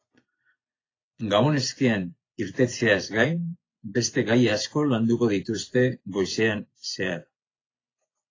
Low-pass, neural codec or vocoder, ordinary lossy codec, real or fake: 7.2 kHz; vocoder, 24 kHz, 100 mel bands, Vocos; AAC, 32 kbps; fake